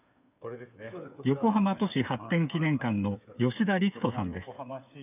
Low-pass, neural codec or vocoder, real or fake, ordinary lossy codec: 3.6 kHz; codec, 16 kHz, 8 kbps, FreqCodec, smaller model; fake; none